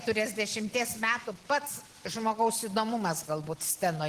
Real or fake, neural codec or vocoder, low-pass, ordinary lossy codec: real; none; 14.4 kHz; Opus, 16 kbps